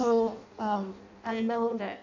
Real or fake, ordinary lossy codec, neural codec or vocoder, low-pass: fake; none; codec, 16 kHz in and 24 kHz out, 0.6 kbps, FireRedTTS-2 codec; 7.2 kHz